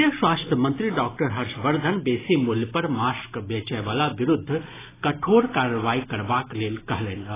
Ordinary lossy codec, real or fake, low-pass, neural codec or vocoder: AAC, 16 kbps; real; 3.6 kHz; none